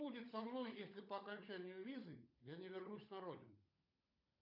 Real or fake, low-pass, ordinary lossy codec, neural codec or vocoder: fake; 5.4 kHz; AAC, 32 kbps; codec, 16 kHz, 16 kbps, FunCodec, trained on LibriTTS, 50 frames a second